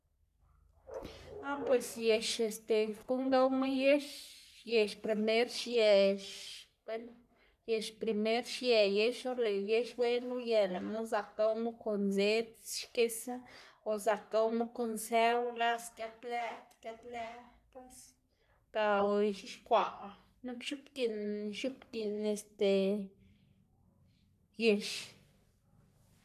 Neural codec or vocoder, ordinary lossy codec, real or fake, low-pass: codec, 44.1 kHz, 3.4 kbps, Pupu-Codec; none; fake; 14.4 kHz